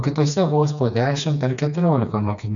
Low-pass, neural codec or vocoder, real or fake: 7.2 kHz; codec, 16 kHz, 2 kbps, FreqCodec, smaller model; fake